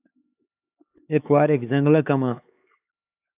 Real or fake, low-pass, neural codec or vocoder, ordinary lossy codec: fake; 3.6 kHz; codec, 16 kHz, 4 kbps, X-Codec, HuBERT features, trained on LibriSpeech; AAC, 32 kbps